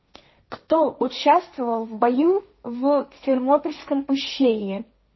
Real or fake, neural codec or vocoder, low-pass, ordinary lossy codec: fake; codec, 16 kHz, 1.1 kbps, Voila-Tokenizer; 7.2 kHz; MP3, 24 kbps